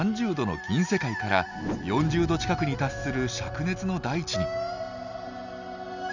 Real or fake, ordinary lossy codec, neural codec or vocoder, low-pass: real; none; none; 7.2 kHz